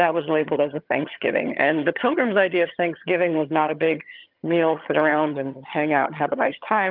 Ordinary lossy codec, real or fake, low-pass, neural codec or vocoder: Opus, 24 kbps; fake; 5.4 kHz; vocoder, 22.05 kHz, 80 mel bands, HiFi-GAN